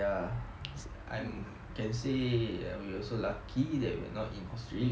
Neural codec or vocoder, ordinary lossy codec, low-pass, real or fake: none; none; none; real